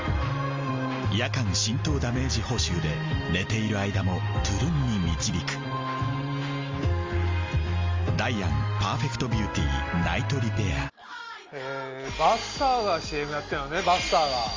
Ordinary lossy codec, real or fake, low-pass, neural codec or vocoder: Opus, 32 kbps; real; 7.2 kHz; none